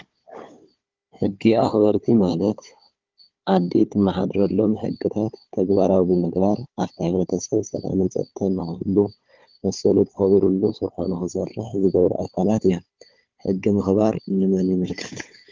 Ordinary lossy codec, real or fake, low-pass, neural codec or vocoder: Opus, 32 kbps; fake; 7.2 kHz; codec, 16 kHz, 4 kbps, FunCodec, trained on Chinese and English, 50 frames a second